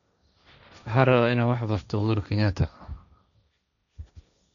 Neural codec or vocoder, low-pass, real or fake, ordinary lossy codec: codec, 16 kHz, 1.1 kbps, Voila-Tokenizer; 7.2 kHz; fake; none